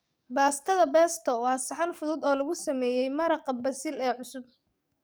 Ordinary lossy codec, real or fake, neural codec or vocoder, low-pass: none; fake; codec, 44.1 kHz, 7.8 kbps, DAC; none